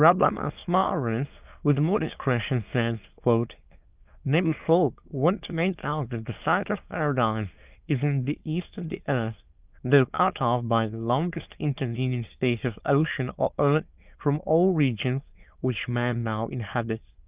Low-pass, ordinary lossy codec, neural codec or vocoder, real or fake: 3.6 kHz; Opus, 24 kbps; autoencoder, 22.05 kHz, a latent of 192 numbers a frame, VITS, trained on many speakers; fake